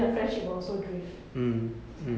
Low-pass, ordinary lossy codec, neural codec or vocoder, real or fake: none; none; none; real